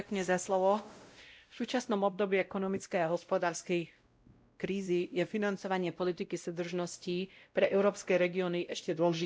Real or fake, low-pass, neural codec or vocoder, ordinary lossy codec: fake; none; codec, 16 kHz, 0.5 kbps, X-Codec, WavLM features, trained on Multilingual LibriSpeech; none